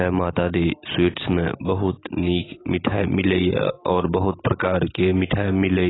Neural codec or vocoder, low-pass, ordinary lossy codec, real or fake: none; 7.2 kHz; AAC, 16 kbps; real